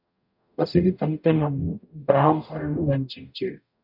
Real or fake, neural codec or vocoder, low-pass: fake; codec, 44.1 kHz, 0.9 kbps, DAC; 5.4 kHz